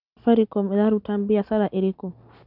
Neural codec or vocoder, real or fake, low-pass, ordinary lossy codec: none; real; 5.4 kHz; none